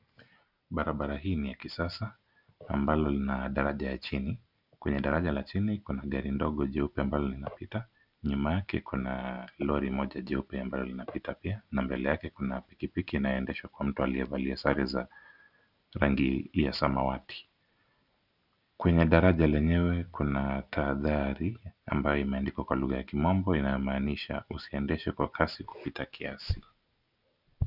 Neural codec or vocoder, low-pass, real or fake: none; 5.4 kHz; real